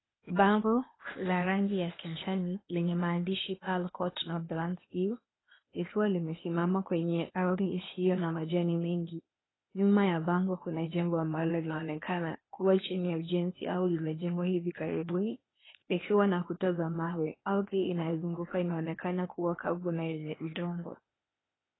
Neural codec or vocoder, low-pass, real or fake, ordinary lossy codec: codec, 16 kHz, 0.8 kbps, ZipCodec; 7.2 kHz; fake; AAC, 16 kbps